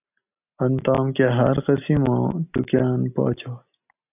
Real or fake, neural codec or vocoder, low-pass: real; none; 3.6 kHz